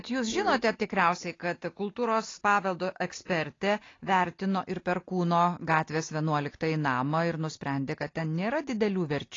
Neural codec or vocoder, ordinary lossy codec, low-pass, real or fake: none; AAC, 32 kbps; 7.2 kHz; real